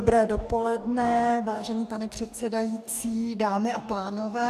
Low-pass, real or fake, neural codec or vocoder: 14.4 kHz; fake; codec, 44.1 kHz, 2.6 kbps, DAC